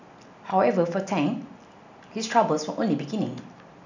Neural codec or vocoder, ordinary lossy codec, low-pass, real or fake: none; none; 7.2 kHz; real